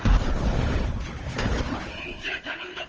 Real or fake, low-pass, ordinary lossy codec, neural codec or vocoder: fake; 7.2 kHz; Opus, 24 kbps; codec, 16 kHz, 4 kbps, FunCodec, trained on Chinese and English, 50 frames a second